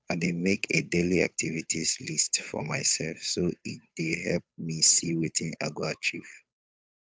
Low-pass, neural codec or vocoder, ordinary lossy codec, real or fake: none; codec, 16 kHz, 8 kbps, FunCodec, trained on Chinese and English, 25 frames a second; none; fake